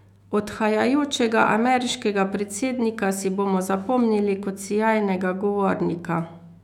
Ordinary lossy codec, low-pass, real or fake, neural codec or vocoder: none; 19.8 kHz; fake; autoencoder, 48 kHz, 128 numbers a frame, DAC-VAE, trained on Japanese speech